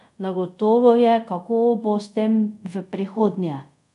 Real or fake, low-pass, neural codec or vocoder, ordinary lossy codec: fake; 10.8 kHz; codec, 24 kHz, 0.5 kbps, DualCodec; none